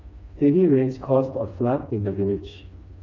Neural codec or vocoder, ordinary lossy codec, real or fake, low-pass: codec, 16 kHz, 2 kbps, FreqCodec, smaller model; none; fake; 7.2 kHz